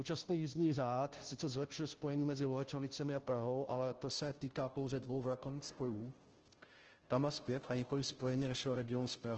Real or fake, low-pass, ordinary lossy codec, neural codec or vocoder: fake; 7.2 kHz; Opus, 16 kbps; codec, 16 kHz, 0.5 kbps, FunCodec, trained on Chinese and English, 25 frames a second